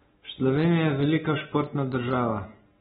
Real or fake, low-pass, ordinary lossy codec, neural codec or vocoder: real; 19.8 kHz; AAC, 16 kbps; none